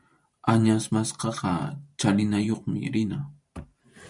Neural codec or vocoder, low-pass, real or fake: vocoder, 44.1 kHz, 128 mel bands every 512 samples, BigVGAN v2; 10.8 kHz; fake